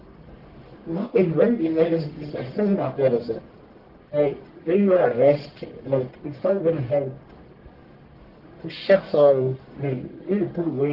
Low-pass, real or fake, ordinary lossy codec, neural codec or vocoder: 5.4 kHz; fake; Opus, 16 kbps; codec, 44.1 kHz, 1.7 kbps, Pupu-Codec